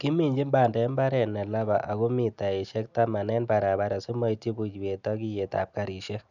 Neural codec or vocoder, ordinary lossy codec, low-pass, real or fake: none; none; 7.2 kHz; real